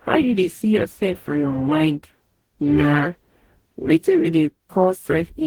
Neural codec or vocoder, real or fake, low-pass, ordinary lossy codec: codec, 44.1 kHz, 0.9 kbps, DAC; fake; 19.8 kHz; Opus, 16 kbps